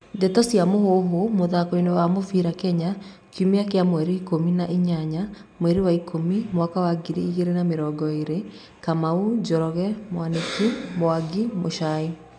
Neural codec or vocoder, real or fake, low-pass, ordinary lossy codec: none; real; 9.9 kHz; none